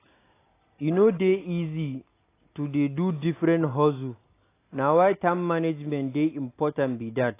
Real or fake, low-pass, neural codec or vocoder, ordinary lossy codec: real; 3.6 kHz; none; AAC, 24 kbps